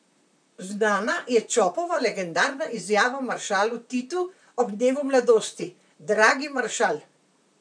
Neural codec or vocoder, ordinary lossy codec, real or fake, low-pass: vocoder, 44.1 kHz, 128 mel bands, Pupu-Vocoder; none; fake; 9.9 kHz